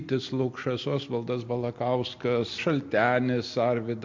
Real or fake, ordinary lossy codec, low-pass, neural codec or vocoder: real; MP3, 48 kbps; 7.2 kHz; none